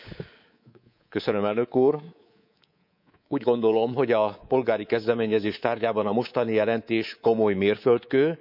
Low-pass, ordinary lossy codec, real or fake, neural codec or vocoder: 5.4 kHz; none; fake; codec, 24 kHz, 3.1 kbps, DualCodec